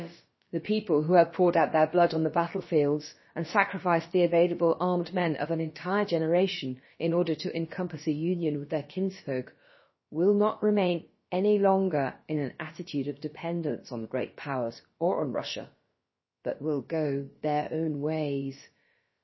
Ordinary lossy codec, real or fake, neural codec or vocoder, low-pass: MP3, 24 kbps; fake; codec, 16 kHz, about 1 kbps, DyCAST, with the encoder's durations; 7.2 kHz